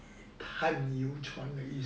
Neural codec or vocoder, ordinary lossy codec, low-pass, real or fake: none; none; none; real